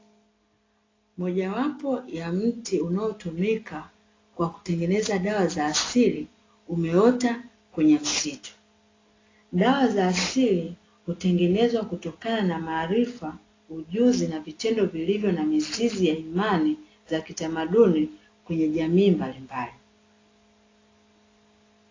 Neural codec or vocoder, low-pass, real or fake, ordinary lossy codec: none; 7.2 kHz; real; AAC, 32 kbps